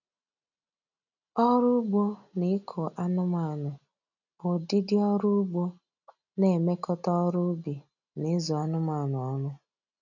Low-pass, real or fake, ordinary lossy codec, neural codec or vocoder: 7.2 kHz; real; none; none